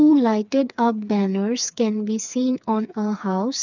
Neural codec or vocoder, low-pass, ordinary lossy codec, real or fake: codec, 16 kHz, 4 kbps, FreqCodec, smaller model; 7.2 kHz; none; fake